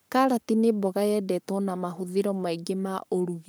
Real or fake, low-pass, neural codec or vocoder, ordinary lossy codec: fake; none; codec, 44.1 kHz, 7.8 kbps, Pupu-Codec; none